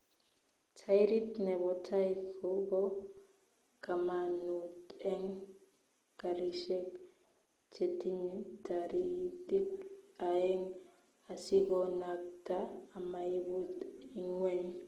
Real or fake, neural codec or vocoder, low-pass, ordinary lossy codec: real; none; 19.8 kHz; Opus, 16 kbps